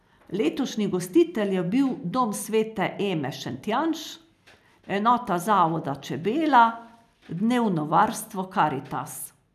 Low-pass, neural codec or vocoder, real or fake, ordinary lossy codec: 14.4 kHz; none; real; none